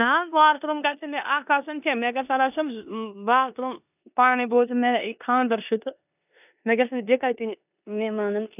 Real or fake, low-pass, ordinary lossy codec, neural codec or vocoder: fake; 3.6 kHz; none; codec, 16 kHz in and 24 kHz out, 0.9 kbps, LongCat-Audio-Codec, four codebook decoder